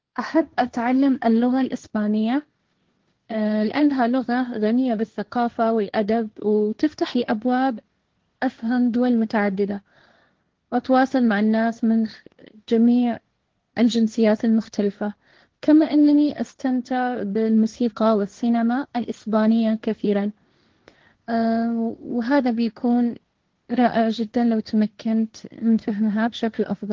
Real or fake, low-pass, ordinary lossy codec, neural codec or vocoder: fake; 7.2 kHz; Opus, 16 kbps; codec, 16 kHz, 1.1 kbps, Voila-Tokenizer